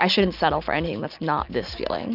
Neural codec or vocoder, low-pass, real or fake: none; 5.4 kHz; real